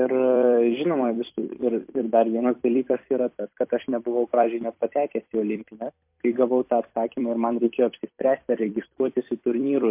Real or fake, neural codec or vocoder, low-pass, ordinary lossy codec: fake; vocoder, 44.1 kHz, 128 mel bands every 256 samples, BigVGAN v2; 3.6 kHz; MP3, 32 kbps